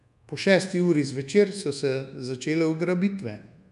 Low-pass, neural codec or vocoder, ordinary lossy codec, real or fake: 10.8 kHz; codec, 24 kHz, 1.2 kbps, DualCodec; none; fake